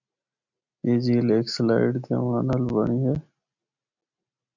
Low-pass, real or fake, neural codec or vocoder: 7.2 kHz; real; none